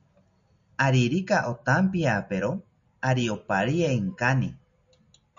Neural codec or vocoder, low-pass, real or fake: none; 7.2 kHz; real